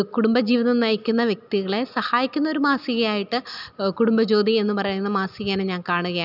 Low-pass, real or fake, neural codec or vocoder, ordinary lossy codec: 5.4 kHz; real; none; none